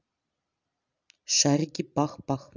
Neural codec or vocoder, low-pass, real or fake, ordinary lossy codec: none; 7.2 kHz; real; none